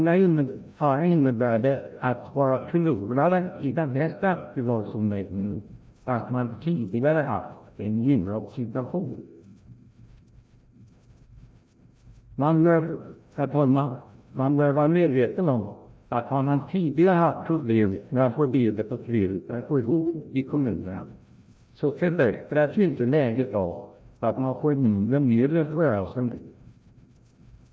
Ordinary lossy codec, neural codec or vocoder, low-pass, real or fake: none; codec, 16 kHz, 0.5 kbps, FreqCodec, larger model; none; fake